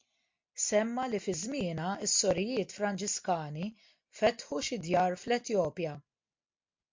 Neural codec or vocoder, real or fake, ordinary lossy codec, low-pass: none; real; MP3, 48 kbps; 7.2 kHz